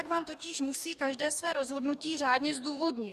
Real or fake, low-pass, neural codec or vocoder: fake; 14.4 kHz; codec, 44.1 kHz, 2.6 kbps, DAC